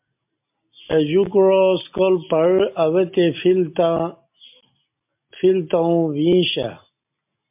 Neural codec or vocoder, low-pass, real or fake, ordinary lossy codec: none; 3.6 kHz; real; MP3, 32 kbps